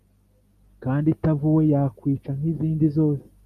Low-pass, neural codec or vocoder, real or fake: 14.4 kHz; none; real